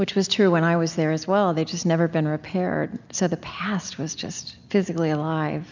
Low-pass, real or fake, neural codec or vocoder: 7.2 kHz; real; none